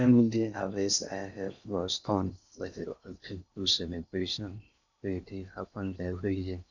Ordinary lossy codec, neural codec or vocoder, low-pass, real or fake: none; codec, 16 kHz in and 24 kHz out, 0.6 kbps, FocalCodec, streaming, 2048 codes; 7.2 kHz; fake